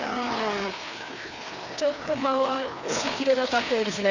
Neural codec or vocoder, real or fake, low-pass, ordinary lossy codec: codec, 16 kHz, 2 kbps, FreqCodec, larger model; fake; 7.2 kHz; none